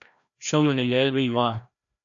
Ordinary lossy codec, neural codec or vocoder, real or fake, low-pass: AAC, 64 kbps; codec, 16 kHz, 1 kbps, FreqCodec, larger model; fake; 7.2 kHz